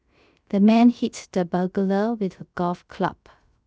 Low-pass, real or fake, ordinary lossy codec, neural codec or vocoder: none; fake; none; codec, 16 kHz, 0.3 kbps, FocalCodec